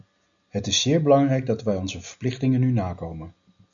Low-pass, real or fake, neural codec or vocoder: 7.2 kHz; real; none